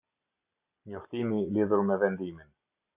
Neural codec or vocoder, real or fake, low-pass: none; real; 3.6 kHz